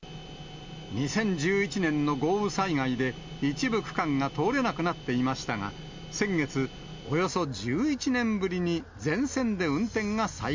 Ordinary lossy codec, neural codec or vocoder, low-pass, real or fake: MP3, 64 kbps; none; 7.2 kHz; real